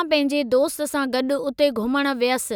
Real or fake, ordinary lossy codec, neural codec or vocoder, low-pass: real; none; none; none